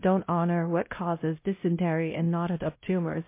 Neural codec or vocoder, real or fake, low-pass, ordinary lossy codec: codec, 16 kHz, 0.5 kbps, X-Codec, WavLM features, trained on Multilingual LibriSpeech; fake; 3.6 kHz; MP3, 24 kbps